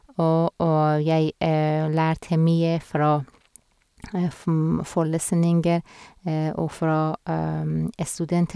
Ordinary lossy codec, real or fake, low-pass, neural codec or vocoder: none; real; none; none